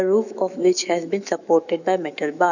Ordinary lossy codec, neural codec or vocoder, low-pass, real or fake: none; autoencoder, 48 kHz, 128 numbers a frame, DAC-VAE, trained on Japanese speech; 7.2 kHz; fake